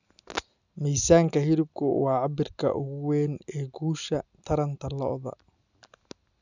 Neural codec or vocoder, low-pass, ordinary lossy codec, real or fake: none; 7.2 kHz; none; real